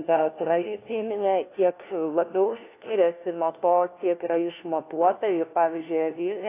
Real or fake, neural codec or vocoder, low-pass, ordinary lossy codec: fake; codec, 16 kHz, 1 kbps, FunCodec, trained on LibriTTS, 50 frames a second; 3.6 kHz; AAC, 24 kbps